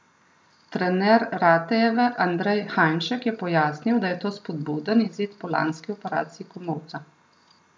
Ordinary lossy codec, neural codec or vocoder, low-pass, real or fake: none; none; none; real